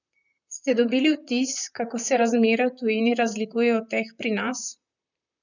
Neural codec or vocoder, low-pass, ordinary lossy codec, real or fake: vocoder, 44.1 kHz, 128 mel bands, Pupu-Vocoder; 7.2 kHz; none; fake